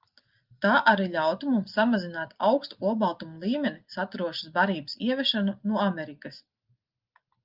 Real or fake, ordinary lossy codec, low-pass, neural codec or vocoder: real; Opus, 24 kbps; 5.4 kHz; none